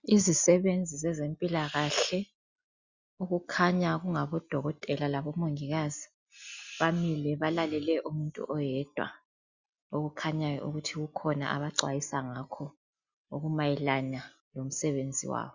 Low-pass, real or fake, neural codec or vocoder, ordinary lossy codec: 7.2 kHz; real; none; Opus, 64 kbps